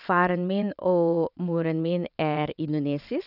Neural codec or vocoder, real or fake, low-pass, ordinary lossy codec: vocoder, 22.05 kHz, 80 mel bands, WaveNeXt; fake; 5.4 kHz; none